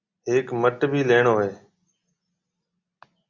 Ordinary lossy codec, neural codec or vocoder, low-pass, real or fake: Opus, 64 kbps; none; 7.2 kHz; real